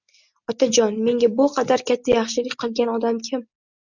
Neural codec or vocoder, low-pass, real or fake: none; 7.2 kHz; real